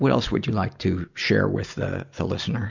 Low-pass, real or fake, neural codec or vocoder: 7.2 kHz; real; none